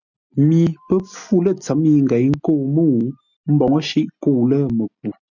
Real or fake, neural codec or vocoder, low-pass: real; none; 7.2 kHz